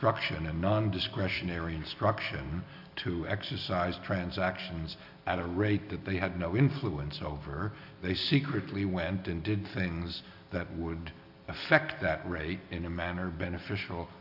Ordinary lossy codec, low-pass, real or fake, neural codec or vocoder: AAC, 48 kbps; 5.4 kHz; real; none